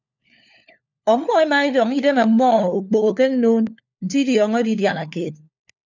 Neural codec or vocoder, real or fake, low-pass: codec, 16 kHz, 4 kbps, FunCodec, trained on LibriTTS, 50 frames a second; fake; 7.2 kHz